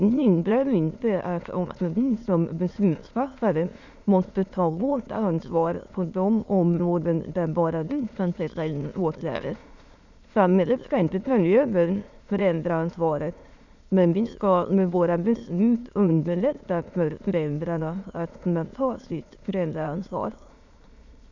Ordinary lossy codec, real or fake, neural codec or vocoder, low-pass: none; fake; autoencoder, 22.05 kHz, a latent of 192 numbers a frame, VITS, trained on many speakers; 7.2 kHz